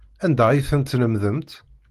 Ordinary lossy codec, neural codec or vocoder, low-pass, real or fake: Opus, 24 kbps; none; 14.4 kHz; real